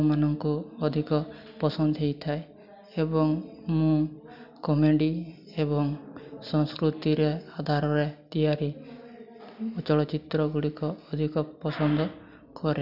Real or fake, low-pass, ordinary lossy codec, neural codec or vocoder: real; 5.4 kHz; none; none